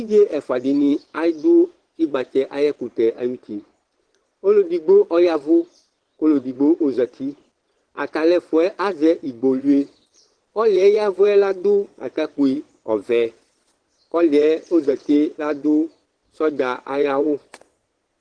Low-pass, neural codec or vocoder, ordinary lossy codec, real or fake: 9.9 kHz; vocoder, 22.05 kHz, 80 mel bands, Vocos; Opus, 16 kbps; fake